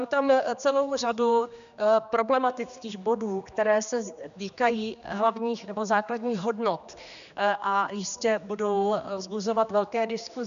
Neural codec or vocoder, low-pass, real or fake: codec, 16 kHz, 2 kbps, X-Codec, HuBERT features, trained on general audio; 7.2 kHz; fake